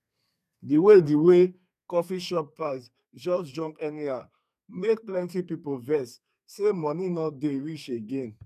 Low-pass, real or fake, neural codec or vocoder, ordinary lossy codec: 14.4 kHz; fake; codec, 32 kHz, 1.9 kbps, SNAC; none